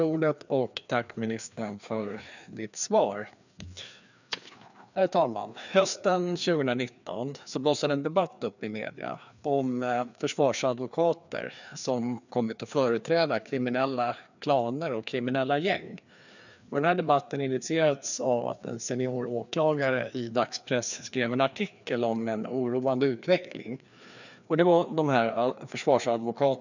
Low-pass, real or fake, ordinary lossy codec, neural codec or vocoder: 7.2 kHz; fake; none; codec, 16 kHz, 2 kbps, FreqCodec, larger model